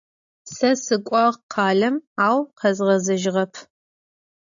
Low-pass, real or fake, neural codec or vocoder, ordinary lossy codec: 7.2 kHz; real; none; AAC, 64 kbps